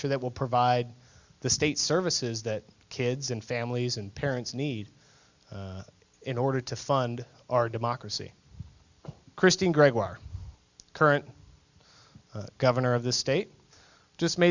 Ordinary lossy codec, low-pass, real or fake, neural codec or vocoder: Opus, 64 kbps; 7.2 kHz; real; none